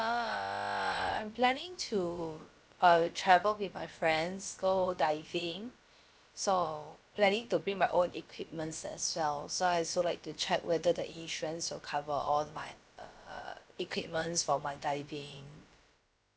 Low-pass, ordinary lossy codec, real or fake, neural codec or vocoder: none; none; fake; codec, 16 kHz, about 1 kbps, DyCAST, with the encoder's durations